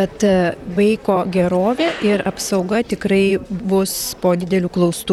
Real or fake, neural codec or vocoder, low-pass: fake; vocoder, 44.1 kHz, 128 mel bands, Pupu-Vocoder; 19.8 kHz